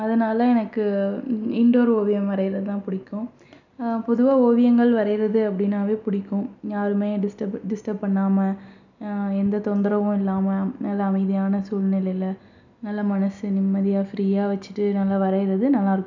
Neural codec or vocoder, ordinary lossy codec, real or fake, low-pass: none; none; real; 7.2 kHz